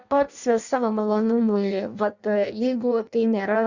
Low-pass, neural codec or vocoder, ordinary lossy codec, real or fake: 7.2 kHz; codec, 16 kHz in and 24 kHz out, 0.6 kbps, FireRedTTS-2 codec; Opus, 64 kbps; fake